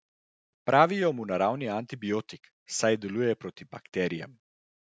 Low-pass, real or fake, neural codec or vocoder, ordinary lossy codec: none; real; none; none